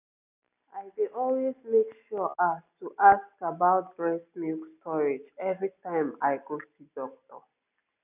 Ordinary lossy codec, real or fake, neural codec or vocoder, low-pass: none; real; none; 3.6 kHz